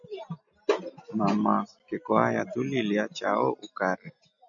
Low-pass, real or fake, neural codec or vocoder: 7.2 kHz; real; none